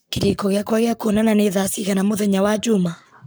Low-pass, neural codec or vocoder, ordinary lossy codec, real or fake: none; codec, 44.1 kHz, 7.8 kbps, Pupu-Codec; none; fake